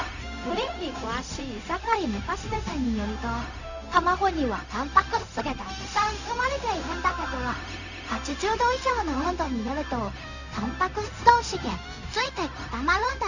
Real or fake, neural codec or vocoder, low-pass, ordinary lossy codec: fake; codec, 16 kHz, 0.4 kbps, LongCat-Audio-Codec; 7.2 kHz; none